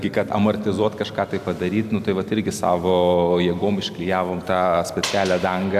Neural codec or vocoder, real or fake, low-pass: none; real; 14.4 kHz